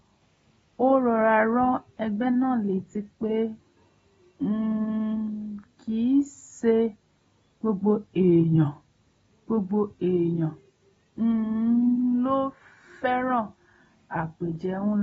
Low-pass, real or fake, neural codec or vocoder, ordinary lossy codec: 19.8 kHz; real; none; AAC, 24 kbps